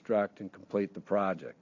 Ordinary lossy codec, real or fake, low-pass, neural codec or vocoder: AAC, 48 kbps; real; 7.2 kHz; none